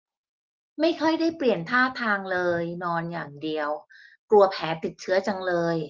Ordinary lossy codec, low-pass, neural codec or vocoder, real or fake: Opus, 32 kbps; 7.2 kHz; none; real